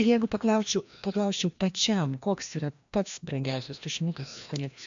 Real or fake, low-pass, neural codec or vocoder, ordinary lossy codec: fake; 7.2 kHz; codec, 16 kHz, 1 kbps, FreqCodec, larger model; AAC, 64 kbps